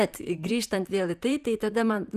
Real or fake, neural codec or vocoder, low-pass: fake; vocoder, 44.1 kHz, 128 mel bands, Pupu-Vocoder; 14.4 kHz